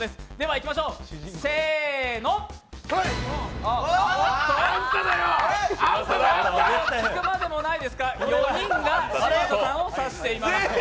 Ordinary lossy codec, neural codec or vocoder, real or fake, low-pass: none; none; real; none